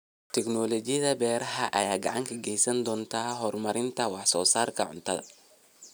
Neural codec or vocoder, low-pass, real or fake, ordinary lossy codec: none; none; real; none